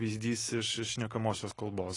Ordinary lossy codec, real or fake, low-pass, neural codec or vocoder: AAC, 32 kbps; real; 10.8 kHz; none